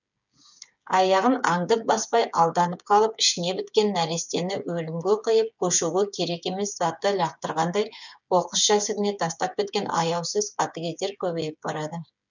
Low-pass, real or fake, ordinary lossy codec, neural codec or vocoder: 7.2 kHz; fake; none; codec, 16 kHz, 8 kbps, FreqCodec, smaller model